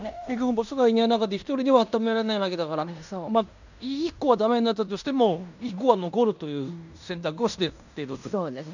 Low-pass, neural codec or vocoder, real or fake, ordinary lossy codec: 7.2 kHz; codec, 16 kHz in and 24 kHz out, 0.9 kbps, LongCat-Audio-Codec, fine tuned four codebook decoder; fake; none